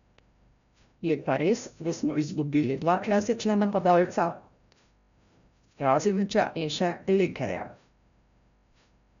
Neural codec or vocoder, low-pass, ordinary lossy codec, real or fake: codec, 16 kHz, 0.5 kbps, FreqCodec, larger model; 7.2 kHz; none; fake